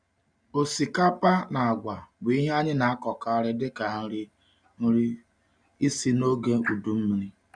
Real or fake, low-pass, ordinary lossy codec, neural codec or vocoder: real; 9.9 kHz; none; none